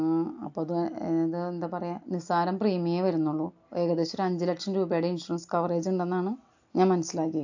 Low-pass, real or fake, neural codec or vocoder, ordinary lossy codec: 7.2 kHz; real; none; AAC, 48 kbps